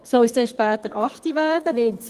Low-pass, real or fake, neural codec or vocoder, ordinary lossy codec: 14.4 kHz; fake; codec, 32 kHz, 1.9 kbps, SNAC; Opus, 24 kbps